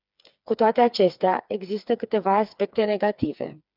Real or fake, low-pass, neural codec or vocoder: fake; 5.4 kHz; codec, 16 kHz, 4 kbps, FreqCodec, smaller model